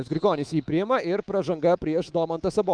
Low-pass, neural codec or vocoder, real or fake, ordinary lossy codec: 9.9 kHz; codec, 24 kHz, 3.1 kbps, DualCodec; fake; Opus, 32 kbps